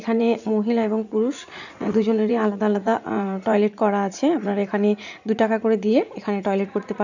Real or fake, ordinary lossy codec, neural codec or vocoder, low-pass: fake; none; vocoder, 44.1 kHz, 80 mel bands, Vocos; 7.2 kHz